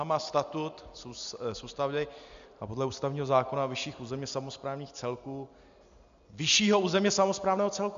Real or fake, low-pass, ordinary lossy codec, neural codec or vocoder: real; 7.2 kHz; MP3, 64 kbps; none